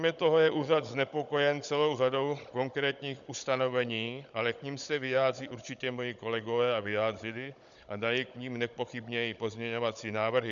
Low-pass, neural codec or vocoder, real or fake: 7.2 kHz; codec, 16 kHz, 16 kbps, FunCodec, trained on Chinese and English, 50 frames a second; fake